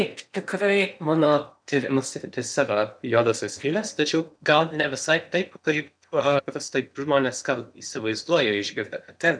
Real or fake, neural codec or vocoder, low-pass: fake; codec, 16 kHz in and 24 kHz out, 0.8 kbps, FocalCodec, streaming, 65536 codes; 9.9 kHz